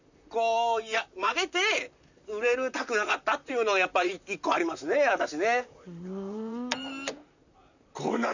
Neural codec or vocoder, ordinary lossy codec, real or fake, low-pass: vocoder, 44.1 kHz, 128 mel bands, Pupu-Vocoder; none; fake; 7.2 kHz